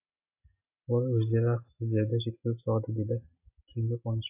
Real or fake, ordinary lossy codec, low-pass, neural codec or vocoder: real; AAC, 32 kbps; 3.6 kHz; none